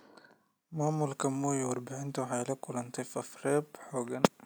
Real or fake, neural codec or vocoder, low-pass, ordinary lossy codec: real; none; none; none